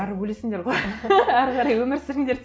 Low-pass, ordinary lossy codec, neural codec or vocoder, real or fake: none; none; none; real